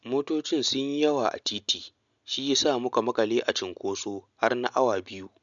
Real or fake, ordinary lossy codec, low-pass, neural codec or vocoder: real; MP3, 64 kbps; 7.2 kHz; none